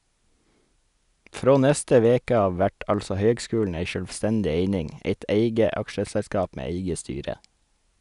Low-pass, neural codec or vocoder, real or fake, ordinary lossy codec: 10.8 kHz; none; real; none